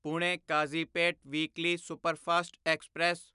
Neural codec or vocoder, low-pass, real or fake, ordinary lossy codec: none; 10.8 kHz; real; none